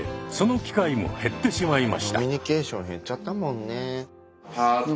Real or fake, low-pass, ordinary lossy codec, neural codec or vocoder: real; none; none; none